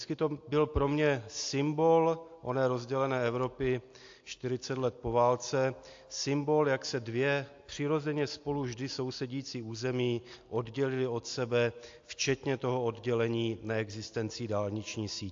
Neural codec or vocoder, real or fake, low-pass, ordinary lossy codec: none; real; 7.2 kHz; AAC, 48 kbps